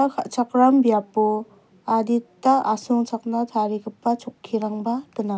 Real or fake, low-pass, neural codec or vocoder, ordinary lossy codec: real; none; none; none